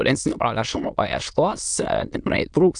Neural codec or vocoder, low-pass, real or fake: autoencoder, 22.05 kHz, a latent of 192 numbers a frame, VITS, trained on many speakers; 9.9 kHz; fake